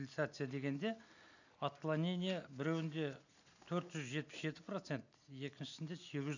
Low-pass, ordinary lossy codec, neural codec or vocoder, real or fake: 7.2 kHz; none; none; real